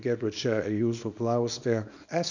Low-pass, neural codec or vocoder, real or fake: 7.2 kHz; codec, 24 kHz, 0.9 kbps, WavTokenizer, small release; fake